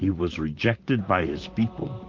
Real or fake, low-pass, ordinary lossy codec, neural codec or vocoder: fake; 7.2 kHz; Opus, 32 kbps; vocoder, 44.1 kHz, 128 mel bands, Pupu-Vocoder